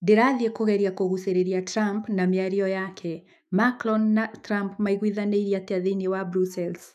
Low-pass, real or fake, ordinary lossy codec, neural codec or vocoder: 14.4 kHz; fake; none; autoencoder, 48 kHz, 128 numbers a frame, DAC-VAE, trained on Japanese speech